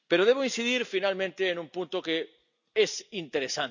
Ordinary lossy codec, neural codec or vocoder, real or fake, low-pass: none; none; real; 7.2 kHz